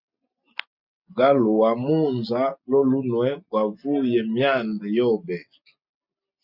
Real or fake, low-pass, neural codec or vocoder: real; 5.4 kHz; none